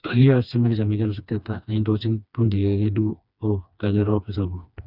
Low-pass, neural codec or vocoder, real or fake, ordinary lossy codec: 5.4 kHz; codec, 16 kHz, 2 kbps, FreqCodec, smaller model; fake; none